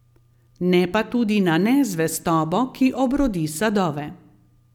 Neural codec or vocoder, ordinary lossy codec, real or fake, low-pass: none; none; real; 19.8 kHz